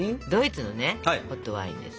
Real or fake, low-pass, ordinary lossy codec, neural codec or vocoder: real; none; none; none